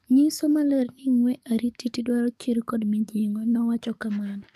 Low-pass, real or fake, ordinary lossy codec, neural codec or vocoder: 14.4 kHz; fake; none; codec, 44.1 kHz, 7.8 kbps, DAC